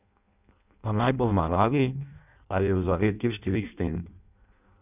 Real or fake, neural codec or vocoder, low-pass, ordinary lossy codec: fake; codec, 16 kHz in and 24 kHz out, 0.6 kbps, FireRedTTS-2 codec; 3.6 kHz; none